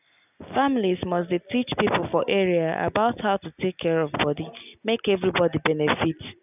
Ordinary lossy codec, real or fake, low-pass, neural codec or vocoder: none; real; 3.6 kHz; none